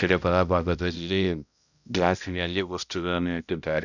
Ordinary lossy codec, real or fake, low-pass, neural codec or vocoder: none; fake; 7.2 kHz; codec, 16 kHz, 0.5 kbps, X-Codec, HuBERT features, trained on balanced general audio